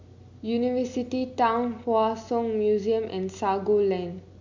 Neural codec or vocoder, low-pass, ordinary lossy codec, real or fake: none; 7.2 kHz; MP3, 64 kbps; real